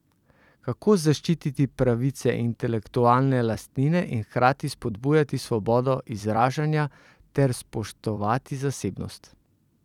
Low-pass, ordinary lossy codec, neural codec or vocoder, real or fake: 19.8 kHz; none; vocoder, 44.1 kHz, 128 mel bands every 256 samples, BigVGAN v2; fake